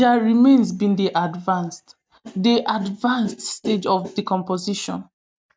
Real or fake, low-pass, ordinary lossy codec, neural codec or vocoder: real; none; none; none